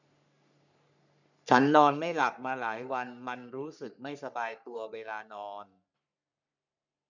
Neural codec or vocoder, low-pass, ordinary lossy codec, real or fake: codec, 44.1 kHz, 3.4 kbps, Pupu-Codec; 7.2 kHz; none; fake